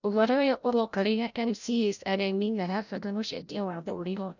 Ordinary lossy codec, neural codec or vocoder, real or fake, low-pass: none; codec, 16 kHz, 0.5 kbps, FreqCodec, larger model; fake; 7.2 kHz